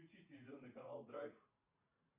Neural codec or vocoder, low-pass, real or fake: vocoder, 44.1 kHz, 80 mel bands, Vocos; 3.6 kHz; fake